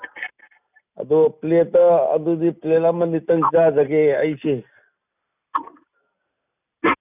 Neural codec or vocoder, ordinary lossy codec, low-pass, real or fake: none; none; 3.6 kHz; real